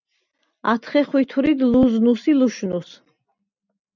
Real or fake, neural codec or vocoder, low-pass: real; none; 7.2 kHz